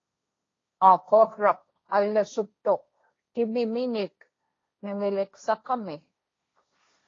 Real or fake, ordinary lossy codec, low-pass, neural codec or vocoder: fake; AAC, 32 kbps; 7.2 kHz; codec, 16 kHz, 1.1 kbps, Voila-Tokenizer